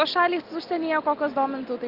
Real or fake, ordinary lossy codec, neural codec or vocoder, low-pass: real; Opus, 32 kbps; none; 5.4 kHz